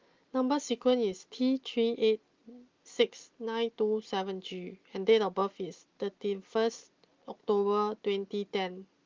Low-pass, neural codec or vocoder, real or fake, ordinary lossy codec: 7.2 kHz; none; real; Opus, 32 kbps